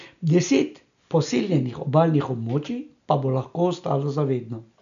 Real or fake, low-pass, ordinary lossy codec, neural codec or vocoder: real; 7.2 kHz; none; none